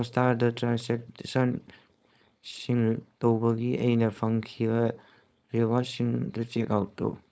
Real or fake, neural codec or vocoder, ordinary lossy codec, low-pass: fake; codec, 16 kHz, 4.8 kbps, FACodec; none; none